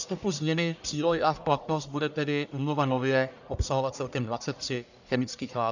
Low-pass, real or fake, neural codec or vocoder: 7.2 kHz; fake; codec, 44.1 kHz, 1.7 kbps, Pupu-Codec